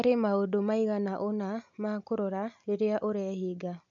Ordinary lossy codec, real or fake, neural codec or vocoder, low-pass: none; real; none; 7.2 kHz